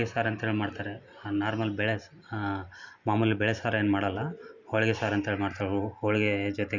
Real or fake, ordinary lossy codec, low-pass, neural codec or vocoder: real; none; 7.2 kHz; none